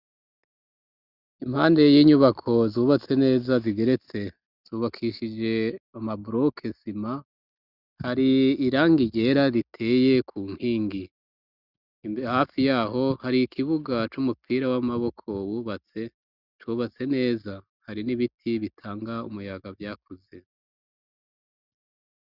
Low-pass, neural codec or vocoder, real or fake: 5.4 kHz; none; real